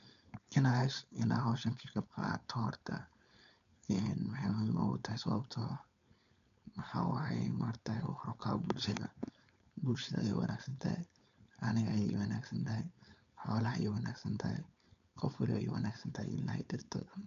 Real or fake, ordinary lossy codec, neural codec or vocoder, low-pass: fake; none; codec, 16 kHz, 4.8 kbps, FACodec; 7.2 kHz